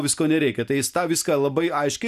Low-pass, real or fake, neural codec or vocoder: 14.4 kHz; real; none